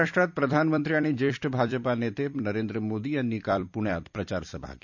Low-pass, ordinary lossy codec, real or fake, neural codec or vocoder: 7.2 kHz; none; fake; vocoder, 44.1 kHz, 128 mel bands every 256 samples, BigVGAN v2